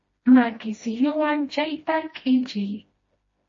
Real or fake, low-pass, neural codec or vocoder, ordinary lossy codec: fake; 7.2 kHz; codec, 16 kHz, 1 kbps, FreqCodec, smaller model; MP3, 32 kbps